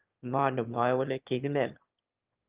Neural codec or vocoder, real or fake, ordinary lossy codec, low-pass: autoencoder, 22.05 kHz, a latent of 192 numbers a frame, VITS, trained on one speaker; fake; Opus, 24 kbps; 3.6 kHz